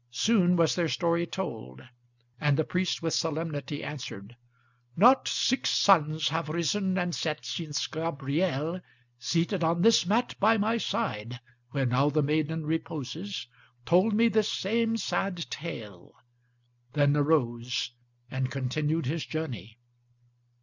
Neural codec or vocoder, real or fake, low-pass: none; real; 7.2 kHz